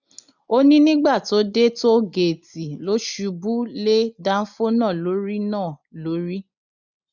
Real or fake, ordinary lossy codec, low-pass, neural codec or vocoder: real; none; 7.2 kHz; none